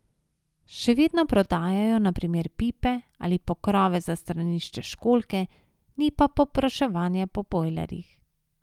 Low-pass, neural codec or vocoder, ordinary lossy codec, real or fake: 19.8 kHz; none; Opus, 32 kbps; real